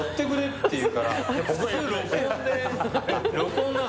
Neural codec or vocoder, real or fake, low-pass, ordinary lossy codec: none; real; none; none